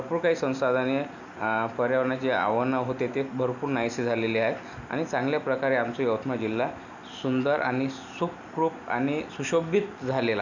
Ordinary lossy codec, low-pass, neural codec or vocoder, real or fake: none; 7.2 kHz; none; real